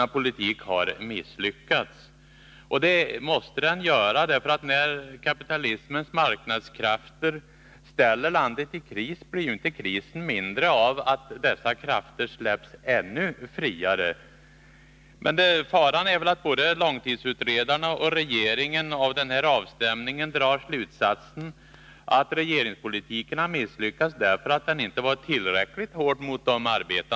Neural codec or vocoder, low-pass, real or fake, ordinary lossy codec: none; none; real; none